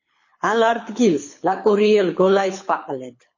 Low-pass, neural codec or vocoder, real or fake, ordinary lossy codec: 7.2 kHz; codec, 24 kHz, 6 kbps, HILCodec; fake; MP3, 32 kbps